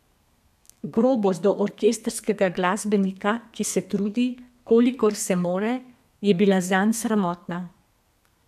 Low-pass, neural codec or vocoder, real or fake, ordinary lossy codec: 14.4 kHz; codec, 32 kHz, 1.9 kbps, SNAC; fake; none